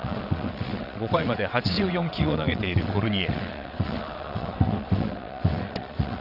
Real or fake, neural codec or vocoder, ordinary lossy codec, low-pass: fake; vocoder, 22.05 kHz, 80 mel bands, WaveNeXt; none; 5.4 kHz